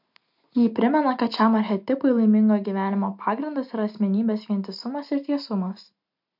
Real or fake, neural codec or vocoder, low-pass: real; none; 5.4 kHz